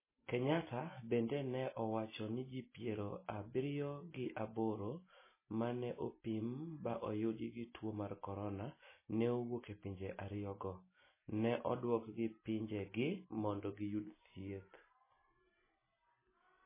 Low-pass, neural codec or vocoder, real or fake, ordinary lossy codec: 3.6 kHz; none; real; MP3, 16 kbps